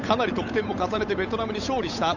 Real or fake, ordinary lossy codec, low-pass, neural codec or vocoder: fake; none; 7.2 kHz; codec, 16 kHz, 8 kbps, FunCodec, trained on Chinese and English, 25 frames a second